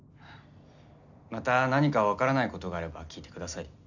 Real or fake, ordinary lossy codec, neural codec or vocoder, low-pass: real; none; none; 7.2 kHz